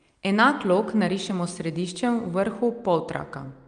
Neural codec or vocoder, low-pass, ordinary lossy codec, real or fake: none; 9.9 kHz; Opus, 32 kbps; real